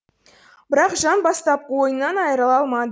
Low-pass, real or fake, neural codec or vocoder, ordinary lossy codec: none; real; none; none